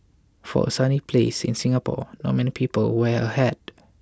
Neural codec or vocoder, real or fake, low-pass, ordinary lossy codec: none; real; none; none